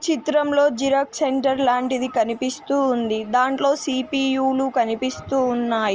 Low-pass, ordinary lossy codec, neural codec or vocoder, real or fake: 7.2 kHz; Opus, 24 kbps; none; real